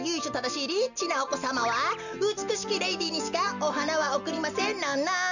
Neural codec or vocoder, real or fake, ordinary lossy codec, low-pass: none; real; none; 7.2 kHz